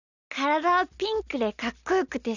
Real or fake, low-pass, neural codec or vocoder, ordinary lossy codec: real; 7.2 kHz; none; none